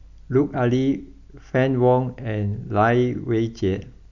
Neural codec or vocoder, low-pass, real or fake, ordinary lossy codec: none; 7.2 kHz; real; none